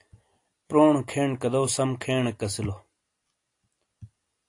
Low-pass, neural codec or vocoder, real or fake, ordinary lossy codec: 10.8 kHz; none; real; AAC, 48 kbps